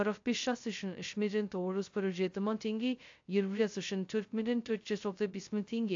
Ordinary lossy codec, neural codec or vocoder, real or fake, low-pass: AAC, 48 kbps; codec, 16 kHz, 0.2 kbps, FocalCodec; fake; 7.2 kHz